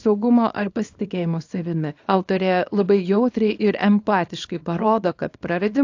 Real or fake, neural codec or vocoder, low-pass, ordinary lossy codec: fake; codec, 24 kHz, 0.9 kbps, WavTokenizer, small release; 7.2 kHz; AAC, 48 kbps